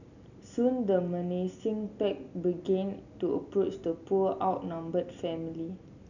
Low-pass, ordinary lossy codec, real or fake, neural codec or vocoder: 7.2 kHz; none; real; none